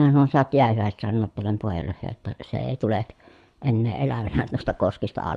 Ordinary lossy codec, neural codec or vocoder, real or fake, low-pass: none; codec, 24 kHz, 6 kbps, HILCodec; fake; none